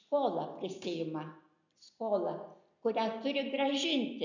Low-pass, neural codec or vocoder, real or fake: 7.2 kHz; none; real